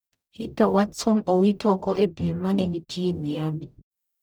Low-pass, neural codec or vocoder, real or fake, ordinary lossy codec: none; codec, 44.1 kHz, 0.9 kbps, DAC; fake; none